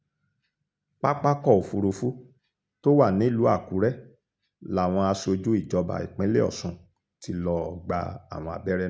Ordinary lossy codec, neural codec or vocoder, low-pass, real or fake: none; none; none; real